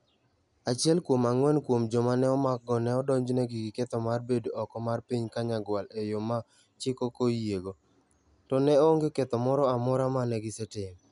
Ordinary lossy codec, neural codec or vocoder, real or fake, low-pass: none; none; real; 10.8 kHz